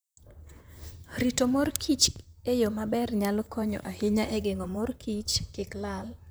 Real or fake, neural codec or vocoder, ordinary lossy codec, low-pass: fake; vocoder, 44.1 kHz, 128 mel bands, Pupu-Vocoder; none; none